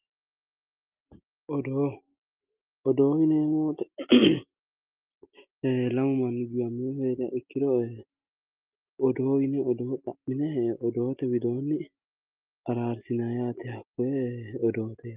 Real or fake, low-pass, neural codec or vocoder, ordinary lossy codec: real; 3.6 kHz; none; Opus, 32 kbps